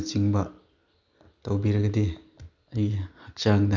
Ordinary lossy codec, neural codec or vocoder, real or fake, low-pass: none; none; real; 7.2 kHz